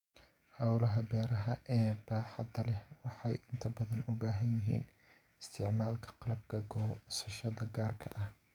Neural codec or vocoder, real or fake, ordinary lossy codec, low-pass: codec, 44.1 kHz, 7.8 kbps, DAC; fake; none; 19.8 kHz